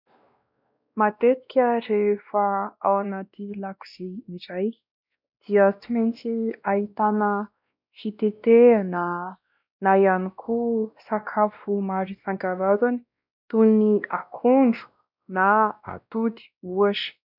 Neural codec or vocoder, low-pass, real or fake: codec, 16 kHz, 1 kbps, X-Codec, WavLM features, trained on Multilingual LibriSpeech; 5.4 kHz; fake